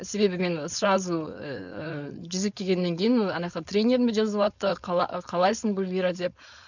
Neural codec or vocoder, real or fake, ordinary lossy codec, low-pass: codec, 16 kHz, 4.8 kbps, FACodec; fake; none; 7.2 kHz